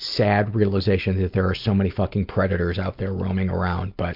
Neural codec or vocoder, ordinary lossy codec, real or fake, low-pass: none; MP3, 48 kbps; real; 5.4 kHz